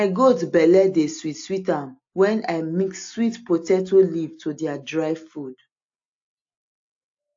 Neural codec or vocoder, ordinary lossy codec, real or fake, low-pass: none; AAC, 48 kbps; real; 7.2 kHz